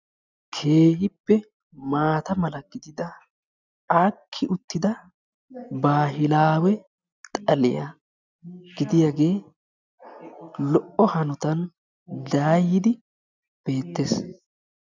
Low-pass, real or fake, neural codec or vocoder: 7.2 kHz; real; none